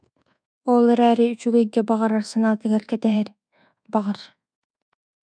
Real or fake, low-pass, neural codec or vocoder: fake; 9.9 kHz; codec, 24 kHz, 1.2 kbps, DualCodec